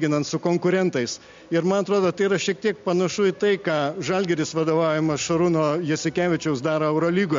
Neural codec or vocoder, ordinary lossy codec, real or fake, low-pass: none; MP3, 48 kbps; real; 7.2 kHz